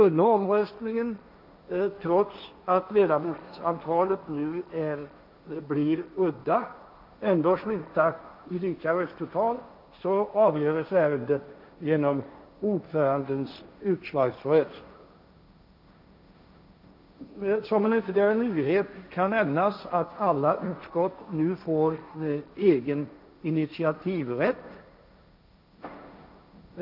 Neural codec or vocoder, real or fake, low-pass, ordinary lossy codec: codec, 16 kHz, 1.1 kbps, Voila-Tokenizer; fake; 5.4 kHz; none